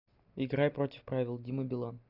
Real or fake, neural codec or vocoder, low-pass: real; none; 5.4 kHz